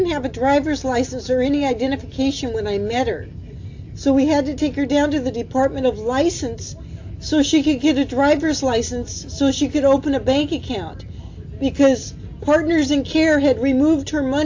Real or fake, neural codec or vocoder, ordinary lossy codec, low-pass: real; none; AAC, 48 kbps; 7.2 kHz